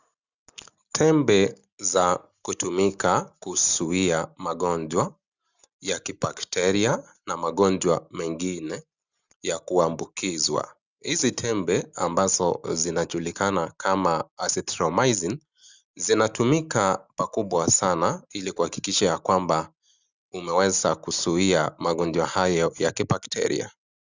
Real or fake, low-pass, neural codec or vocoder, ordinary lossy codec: real; 7.2 kHz; none; Opus, 64 kbps